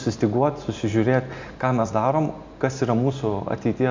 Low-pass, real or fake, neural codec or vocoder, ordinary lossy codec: 7.2 kHz; real; none; MP3, 64 kbps